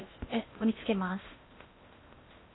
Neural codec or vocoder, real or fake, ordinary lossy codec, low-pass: codec, 16 kHz in and 24 kHz out, 0.8 kbps, FocalCodec, streaming, 65536 codes; fake; AAC, 16 kbps; 7.2 kHz